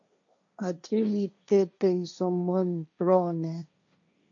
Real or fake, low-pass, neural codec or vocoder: fake; 7.2 kHz; codec, 16 kHz, 1.1 kbps, Voila-Tokenizer